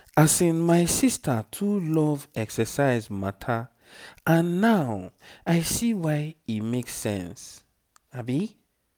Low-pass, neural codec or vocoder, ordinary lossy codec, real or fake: none; none; none; real